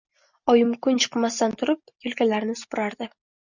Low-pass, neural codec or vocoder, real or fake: 7.2 kHz; none; real